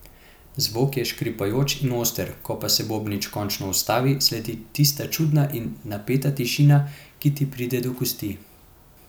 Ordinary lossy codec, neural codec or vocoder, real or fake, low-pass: none; none; real; 19.8 kHz